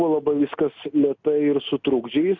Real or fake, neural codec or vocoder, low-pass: real; none; 7.2 kHz